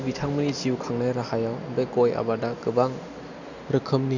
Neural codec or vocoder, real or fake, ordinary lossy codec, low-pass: none; real; none; 7.2 kHz